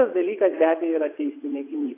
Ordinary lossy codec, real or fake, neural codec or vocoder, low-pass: AAC, 24 kbps; fake; vocoder, 22.05 kHz, 80 mel bands, WaveNeXt; 3.6 kHz